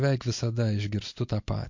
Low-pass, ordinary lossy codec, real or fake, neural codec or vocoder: 7.2 kHz; MP3, 48 kbps; real; none